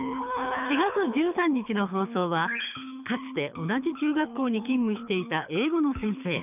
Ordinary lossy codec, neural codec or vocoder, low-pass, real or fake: none; codec, 24 kHz, 6 kbps, HILCodec; 3.6 kHz; fake